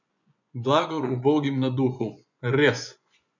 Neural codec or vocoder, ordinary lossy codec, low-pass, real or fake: vocoder, 24 kHz, 100 mel bands, Vocos; none; 7.2 kHz; fake